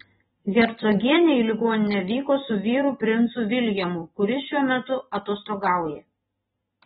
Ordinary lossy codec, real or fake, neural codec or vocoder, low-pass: AAC, 16 kbps; real; none; 19.8 kHz